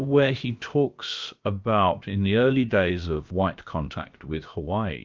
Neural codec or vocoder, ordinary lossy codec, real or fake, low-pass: codec, 16 kHz, about 1 kbps, DyCAST, with the encoder's durations; Opus, 24 kbps; fake; 7.2 kHz